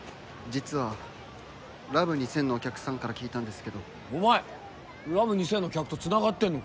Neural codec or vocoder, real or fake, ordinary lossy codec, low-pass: none; real; none; none